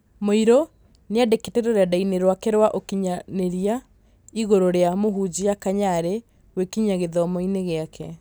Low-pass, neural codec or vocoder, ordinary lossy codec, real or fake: none; none; none; real